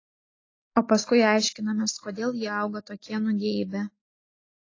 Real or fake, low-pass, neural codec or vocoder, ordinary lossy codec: real; 7.2 kHz; none; AAC, 32 kbps